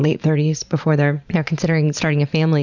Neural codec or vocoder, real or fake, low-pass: vocoder, 44.1 kHz, 128 mel bands every 512 samples, BigVGAN v2; fake; 7.2 kHz